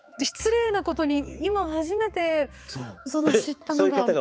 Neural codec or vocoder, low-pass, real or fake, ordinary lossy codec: codec, 16 kHz, 4 kbps, X-Codec, HuBERT features, trained on balanced general audio; none; fake; none